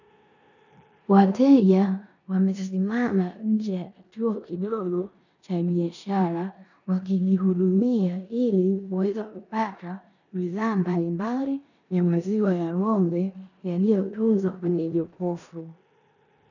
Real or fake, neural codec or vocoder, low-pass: fake; codec, 16 kHz in and 24 kHz out, 0.9 kbps, LongCat-Audio-Codec, four codebook decoder; 7.2 kHz